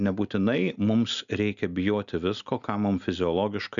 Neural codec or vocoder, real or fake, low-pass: none; real; 7.2 kHz